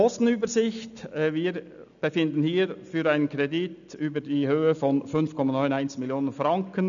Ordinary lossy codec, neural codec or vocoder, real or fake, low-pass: none; none; real; 7.2 kHz